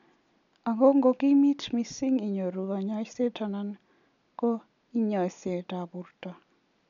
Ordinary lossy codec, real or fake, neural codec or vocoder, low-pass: none; real; none; 7.2 kHz